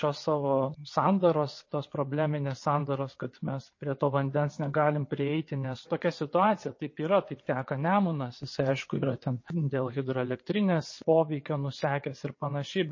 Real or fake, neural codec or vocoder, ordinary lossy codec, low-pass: fake; vocoder, 22.05 kHz, 80 mel bands, WaveNeXt; MP3, 32 kbps; 7.2 kHz